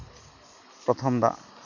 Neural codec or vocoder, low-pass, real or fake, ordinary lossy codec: none; 7.2 kHz; real; none